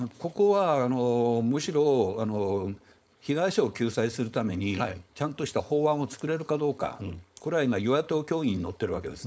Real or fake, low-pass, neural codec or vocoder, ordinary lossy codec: fake; none; codec, 16 kHz, 4.8 kbps, FACodec; none